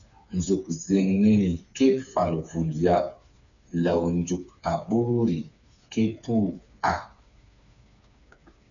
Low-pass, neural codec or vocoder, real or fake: 7.2 kHz; codec, 16 kHz, 4 kbps, FreqCodec, smaller model; fake